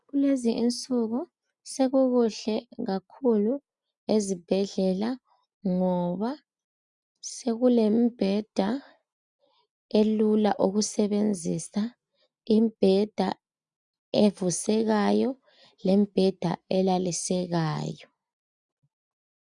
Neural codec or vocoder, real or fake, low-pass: none; real; 10.8 kHz